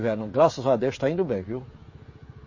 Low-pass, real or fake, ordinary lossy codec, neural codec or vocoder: 7.2 kHz; fake; MP3, 32 kbps; codec, 16 kHz, 16 kbps, FreqCodec, smaller model